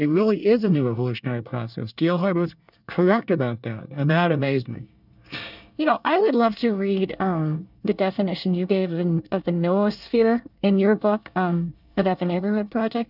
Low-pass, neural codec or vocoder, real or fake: 5.4 kHz; codec, 24 kHz, 1 kbps, SNAC; fake